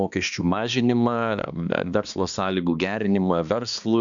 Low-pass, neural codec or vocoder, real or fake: 7.2 kHz; codec, 16 kHz, 2 kbps, X-Codec, HuBERT features, trained on balanced general audio; fake